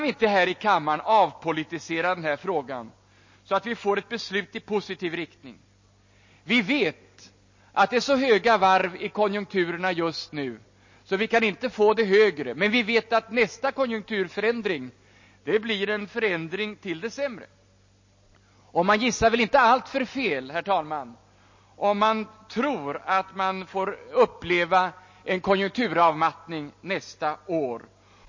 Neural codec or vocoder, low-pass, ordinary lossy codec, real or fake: none; 7.2 kHz; MP3, 32 kbps; real